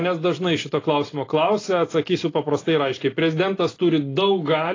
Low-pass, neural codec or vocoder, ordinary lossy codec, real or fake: 7.2 kHz; none; AAC, 32 kbps; real